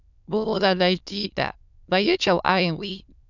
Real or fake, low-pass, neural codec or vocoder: fake; 7.2 kHz; autoencoder, 22.05 kHz, a latent of 192 numbers a frame, VITS, trained on many speakers